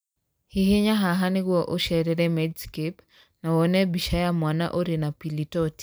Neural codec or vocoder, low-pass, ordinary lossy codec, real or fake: none; none; none; real